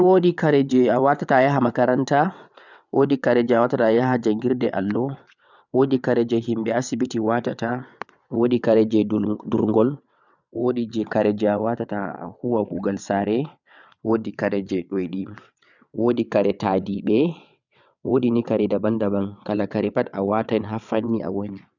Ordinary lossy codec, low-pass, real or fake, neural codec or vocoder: none; 7.2 kHz; fake; vocoder, 22.05 kHz, 80 mel bands, WaveNeXt